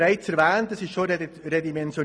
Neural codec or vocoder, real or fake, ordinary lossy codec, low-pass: none; real; none; none